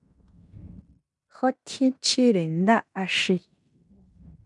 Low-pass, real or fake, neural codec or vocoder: 10.8 kHz; fake; codec, 16 kHz in and 24 kHz out, 0.9 kbps, LongCat-Audio-Codec, fine tuned four codebook decoder